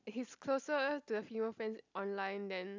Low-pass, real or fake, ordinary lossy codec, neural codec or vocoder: 7.2 kHz; real; none; none